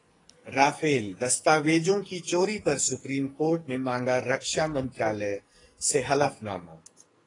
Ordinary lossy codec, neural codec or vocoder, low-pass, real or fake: AAC, 32 kbps; codec, 44.1 kHz, 2.6 kbps, SNAC; 10.8 kHz; fake